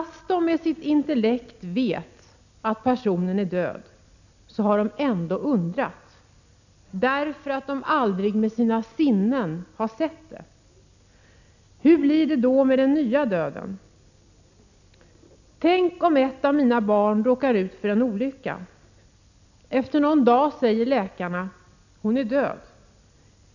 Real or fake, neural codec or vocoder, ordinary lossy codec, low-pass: real; none; none; 7.2 kHz